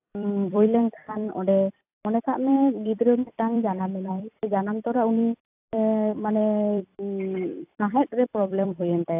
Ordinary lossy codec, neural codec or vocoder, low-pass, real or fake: AAC, 32 kbps; vocoder, 44.1 kHz, 128 mel bands every 256 samples, BigVGAN v2; 3.6 kHz; fake